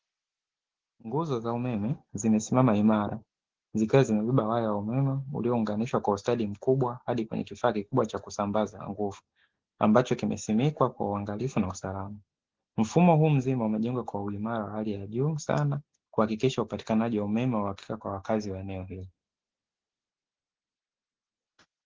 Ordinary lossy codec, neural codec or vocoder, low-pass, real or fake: Opus, 16 kbps; none; 7.2 kHz; real